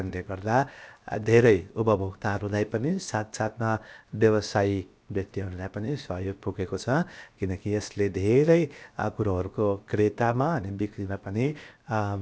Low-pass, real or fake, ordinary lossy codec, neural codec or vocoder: none; fake; none; codec, 16 kHz, 0.7 kbps, FocalCodec